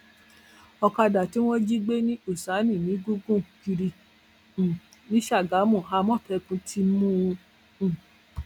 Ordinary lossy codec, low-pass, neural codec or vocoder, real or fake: none; 19.8 kHz; none; real